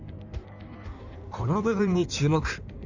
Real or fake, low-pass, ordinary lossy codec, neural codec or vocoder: fake; 7.2 kHz; none; codec, 16 kHz in and 24 kHz out, 1.1 kbps, FireRedTTS-2 codec